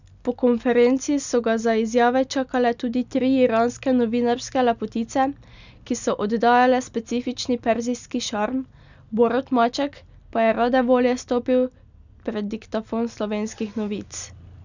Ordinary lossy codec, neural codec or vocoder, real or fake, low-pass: none; none; real; 7.2 kHz